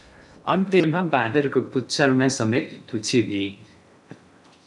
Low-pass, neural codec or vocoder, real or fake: 10.8 kHz; codec, 16 kHz in and 24 kHz out, 0.6 kbps, FocalCodec, streaming, 2048 codes; fake